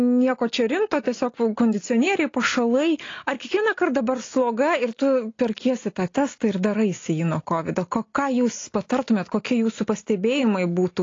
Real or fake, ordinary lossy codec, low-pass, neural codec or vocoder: real; AAC, 32 kbps; 7.2 kHz; none